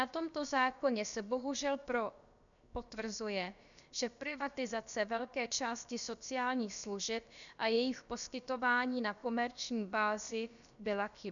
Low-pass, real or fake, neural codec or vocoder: 7.2 kHz; fake; codec, 16 kHz, about 1 kbps, DyCAST, with the encoder's durations